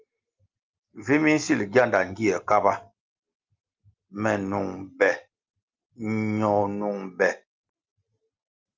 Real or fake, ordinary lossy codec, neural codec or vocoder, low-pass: real; Opus, 24 kbps; none; 7.2 kHz